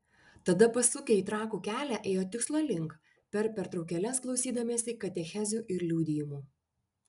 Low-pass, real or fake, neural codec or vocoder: 10.8 kHz; real; none